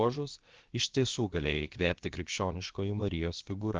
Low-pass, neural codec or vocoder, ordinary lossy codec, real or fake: 7.2 kHz; codec, 16 kHz, about 1 kbps, DyCAST, with the encoder's durations; Opus, 16 kbps; fake